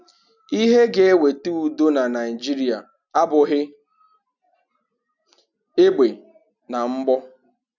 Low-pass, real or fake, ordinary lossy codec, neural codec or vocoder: 7.2 kHz; real; none; none